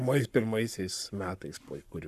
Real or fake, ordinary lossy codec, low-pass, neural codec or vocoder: fake; AAC, 96 kbps; 14.4 kHz; codec, 44.1 kHz, 7.8 kbps, Pupu-Codec